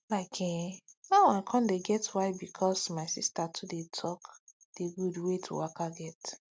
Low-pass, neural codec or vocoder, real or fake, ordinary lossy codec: none; none; real; none